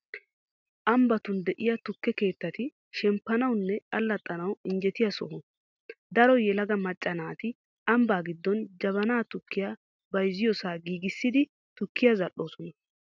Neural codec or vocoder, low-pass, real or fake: none; 7.2 kHz; real